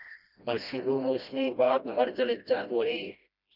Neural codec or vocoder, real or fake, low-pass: codec, 16 kHz, 1 kbps, FreqCodec, smaller model; fake; 5.4 kHz